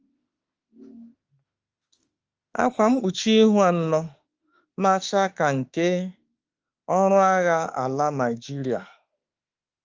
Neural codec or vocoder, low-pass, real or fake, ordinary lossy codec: autoencoder, 48 kHz, 32 numbers a frame, DAC-VAE, trained on Japanese speech; 7.2 kHz; fake; Opus, 24 kbps